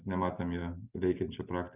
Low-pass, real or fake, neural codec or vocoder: 3.6 kHz; real; none